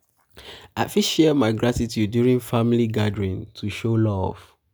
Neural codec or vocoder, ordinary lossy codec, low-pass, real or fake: none; none; none; real